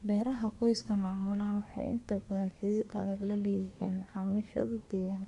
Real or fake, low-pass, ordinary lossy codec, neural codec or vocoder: fake; 10.8 kHz; none; codec, 24 kHz, 1 kbps, SNAC